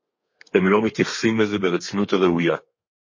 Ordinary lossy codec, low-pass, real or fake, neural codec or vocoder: MP3, 32 kbps; 7.2 kHz; fake; codec, 32 kHz, 1.9 kbps, SNAC